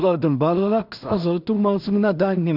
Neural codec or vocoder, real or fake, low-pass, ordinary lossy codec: codec, 16 kHz in and 24 kHz out, 0.4 kbps, LongCat-Audio-Codec, two codebook decoder; fake; 5.4 kHz; AAC, 48 kbps